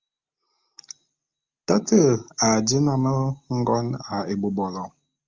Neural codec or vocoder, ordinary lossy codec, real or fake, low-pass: none; Opus, 24 kbps; real; 7.2 kHz